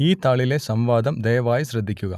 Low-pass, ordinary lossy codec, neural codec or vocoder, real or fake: 14.4 kHz; none; none; real